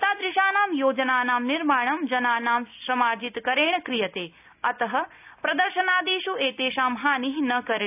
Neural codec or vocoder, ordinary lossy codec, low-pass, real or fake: none; none; 3.6 kHz; real